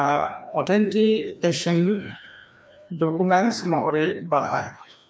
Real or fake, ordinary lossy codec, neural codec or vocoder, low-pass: fake; none; codec, 16 kHz, 1 kbps, FreqCodec, larger model; none